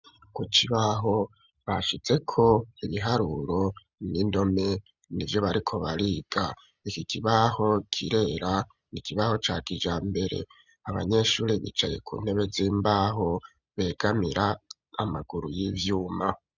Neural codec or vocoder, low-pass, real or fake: none; 7.2 kHz; real